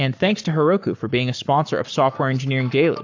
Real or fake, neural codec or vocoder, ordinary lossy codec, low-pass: fake; codec, 44.1 kHz, 7.8 kbps, Pupu-Codec; AAC, 48 kbps; 7.2 kHz